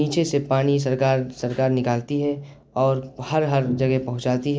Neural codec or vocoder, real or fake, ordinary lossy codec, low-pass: none; real; none; none